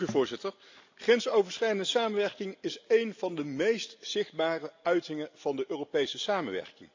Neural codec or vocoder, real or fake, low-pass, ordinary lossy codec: none; real; 7.2 kHz; AAC, 48 kbps